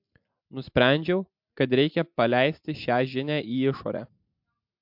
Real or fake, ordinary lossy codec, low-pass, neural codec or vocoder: real; MP3, 48 kbps; 5.4 kHz; none